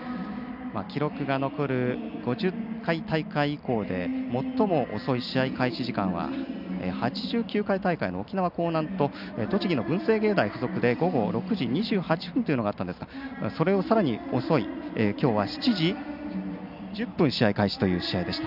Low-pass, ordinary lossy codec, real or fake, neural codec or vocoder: 5.4 kHz; none; real; none